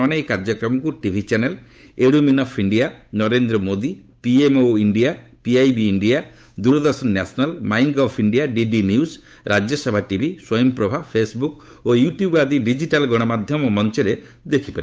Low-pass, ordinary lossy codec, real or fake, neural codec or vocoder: none; none; fake; codec, 16 kHz, 8 kbps, FunCodec, trained on Chinese and English, 25 frames a second